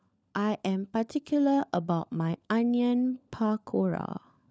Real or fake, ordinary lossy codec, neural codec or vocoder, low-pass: fake; none; codec, 16 kHz, 16 kbps, FunCodec, trained on LibriTTS, 50 frames a second; none